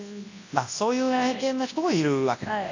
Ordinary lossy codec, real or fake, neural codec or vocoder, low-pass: AAC, 48 kbps; fake; codec, 24 kHz, 0.9 kbps, WavTokenizer, large speech release; 7.2 kHz